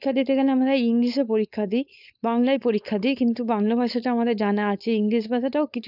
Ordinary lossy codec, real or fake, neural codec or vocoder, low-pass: none; fake; codec, 16 kHz, 4.8 kbps, FACodec; 5.4 kHz